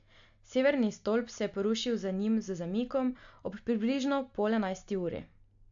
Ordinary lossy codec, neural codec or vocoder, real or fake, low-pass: AAC, 64 kbps; none; real; 7.2 kHz